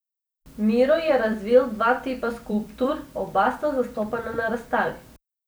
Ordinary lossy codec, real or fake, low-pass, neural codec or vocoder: none; fake; none; vocoder, 44.1 kHz, 128 mel bands every 256 samples, BigVGAN v2